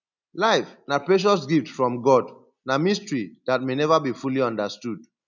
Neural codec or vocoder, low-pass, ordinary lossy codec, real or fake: none; 7.2 kHz; none; real